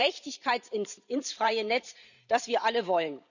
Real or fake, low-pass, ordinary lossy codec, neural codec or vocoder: fake; 7.2 kHz; none; vocoder, 44.1 kHz, 128 mel bands every 512 samples, BigVGAN v2